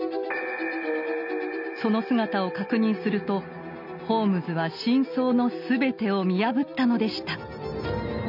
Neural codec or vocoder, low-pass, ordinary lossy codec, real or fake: none; 5.4 kHz; none; real